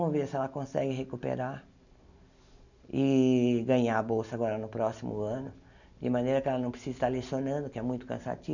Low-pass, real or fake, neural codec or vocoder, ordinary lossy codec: 7.2 kHz; real; none; none